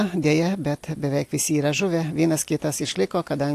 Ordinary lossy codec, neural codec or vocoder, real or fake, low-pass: AAC, 64 kbps; none; real; 14.4 kHz